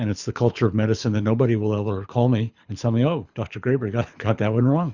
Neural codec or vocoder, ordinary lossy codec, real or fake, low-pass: codec, 24 kHz, 6 kbps, HILCodec; Opus, 64 kbps; fake; 7.2 kHz